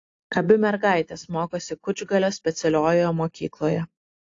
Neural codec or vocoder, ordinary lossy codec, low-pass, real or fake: none; AAC, 48 kbps; 7.2 kHz; real